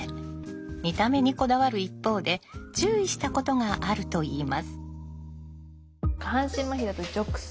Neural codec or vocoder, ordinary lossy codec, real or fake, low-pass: none; none; real; none